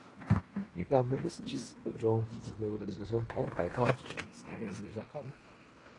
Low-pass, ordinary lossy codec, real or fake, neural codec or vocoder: 10.8 kHz; AAC, 32 kbps; fake; codec, 16 kHz in and 24 kHz out, 0.9 kbps, LongCat-Audio-Codec, fine tuned four codebook decoder